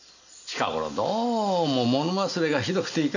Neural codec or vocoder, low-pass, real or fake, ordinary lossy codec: none; 7.2 kHz; real; none